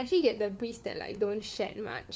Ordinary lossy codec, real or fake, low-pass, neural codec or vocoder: none; fake; none; codec, 16 kHz, 4 kbps, FunCodec, trained on LibriTTS, 50 frames a second